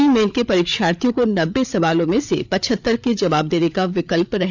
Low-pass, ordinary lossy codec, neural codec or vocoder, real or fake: 7.2 kHz; none; codec, 16 kHz, 8 kbps, FreqCodec, larger model; fake